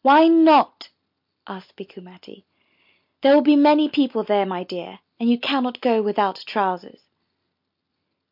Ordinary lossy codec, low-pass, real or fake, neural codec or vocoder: MP3, 48 kbps; 5.4 kHz; real; none